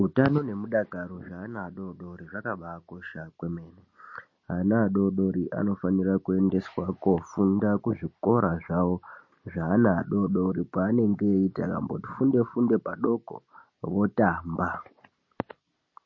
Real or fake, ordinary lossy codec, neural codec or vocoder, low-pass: real; MP3, 32 kbps; none; 7.2 kHz